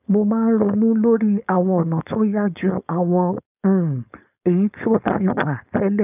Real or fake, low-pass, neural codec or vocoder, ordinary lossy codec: fake; 3.6 kHz; codec, 16 kHz, 4.8 kbps, FACodec; none